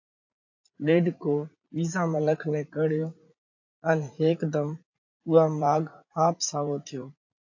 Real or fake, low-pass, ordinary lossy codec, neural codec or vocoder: fake; 7.2 kHz; AAC, 48 kbps; vocoder, 44.1 kHz, 80 mel bands, Vocos